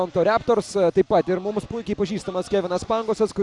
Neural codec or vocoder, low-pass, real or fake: none; 10.8 kHz; real